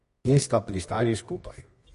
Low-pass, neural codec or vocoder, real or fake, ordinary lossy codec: 10.8 kHz; codec, 24 kHz, 0.9 kbps, WavTokenizer, medium music audio release; fake; MP3, 48 kbps